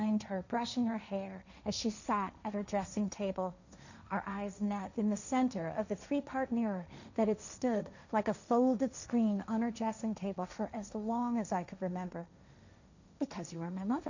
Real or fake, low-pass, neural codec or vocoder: fake; 7.2 kHz; codec, 16 kHz, 1.1 kbps, Voila-Tokenizer